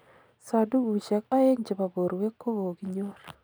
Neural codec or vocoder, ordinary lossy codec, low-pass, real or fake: none; none; none; real